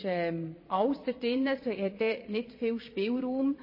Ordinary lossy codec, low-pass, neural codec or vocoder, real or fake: MP3, 24 kbps; 5.4 kHz; vocoder, 22.05 kHz, 80 mel bands, Vocos; fake